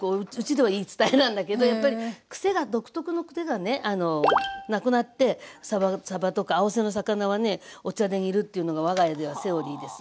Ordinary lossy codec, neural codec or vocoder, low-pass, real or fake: none; none; none; real